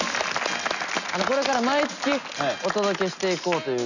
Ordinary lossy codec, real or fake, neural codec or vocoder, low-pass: none; real; none; 7.2 kHz